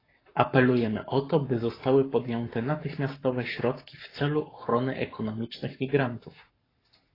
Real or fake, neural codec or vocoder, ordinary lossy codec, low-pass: fake; codec, 44.1 kHz, 7.8 kbps, Pupu-Codec; AAC, 24 kbps; 5.4 kHz